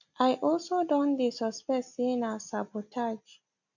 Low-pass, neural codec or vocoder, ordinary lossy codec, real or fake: 7.2 kHz; none; none; real